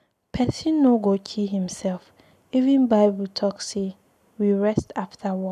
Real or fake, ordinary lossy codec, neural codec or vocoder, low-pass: real; none; none; 14.4 kHz